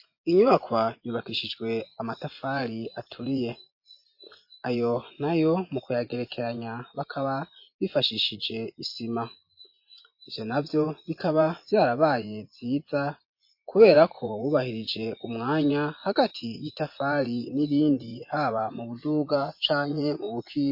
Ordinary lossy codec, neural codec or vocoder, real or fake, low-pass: MP3, 32 kbps; vocoder, 24 kHz, 100 mel bands, Vocos; fake; 5.4 kHz